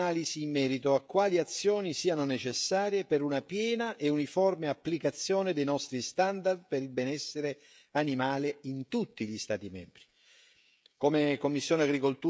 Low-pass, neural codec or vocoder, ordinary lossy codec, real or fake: none; codec, 16 kHz, 16 kbps, FreqCodec, smaller model; none; fake